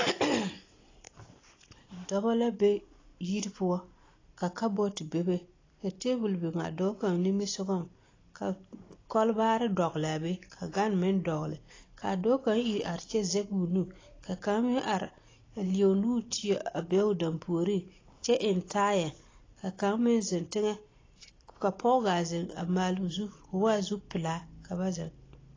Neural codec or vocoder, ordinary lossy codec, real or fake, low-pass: none; AAC, 32 kbps; real; 7.2 kHz